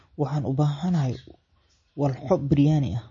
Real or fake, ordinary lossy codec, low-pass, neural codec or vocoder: real; MP3, 32 kbps; 7.2 kHz; none